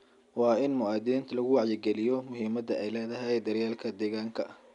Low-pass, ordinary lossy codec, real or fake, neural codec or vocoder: 10.8 kHz; none; real; none